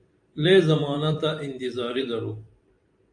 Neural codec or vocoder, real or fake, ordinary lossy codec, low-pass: vocoder, 44.1 kHz, 128 mel bands every 256 samples, BigVGAN v2; fake; AAC, 64 kbps; 9.9 kHz